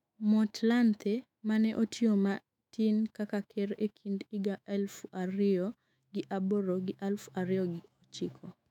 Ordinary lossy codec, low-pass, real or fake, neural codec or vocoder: none; 19.8 kHz; fake; autoencoder, 48 kHz, 128 numbers a frame, DAC-VAE, trained on Japanese speech